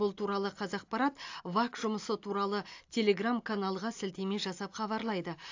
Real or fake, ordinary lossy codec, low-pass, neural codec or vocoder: real; AAC, 48 kbps; 7.2 kHz; none